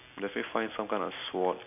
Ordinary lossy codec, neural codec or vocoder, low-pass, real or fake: none; none; 3.6 kHz; real